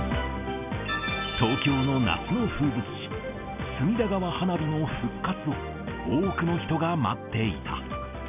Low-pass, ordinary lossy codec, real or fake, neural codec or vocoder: 3.6 kHz; none; real; none